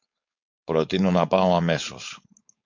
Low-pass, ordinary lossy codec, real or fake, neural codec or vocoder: 7.2 kHz; MP3, 64 kbps; fake; codec, 16 kHz, 4.8 kbps, FACodec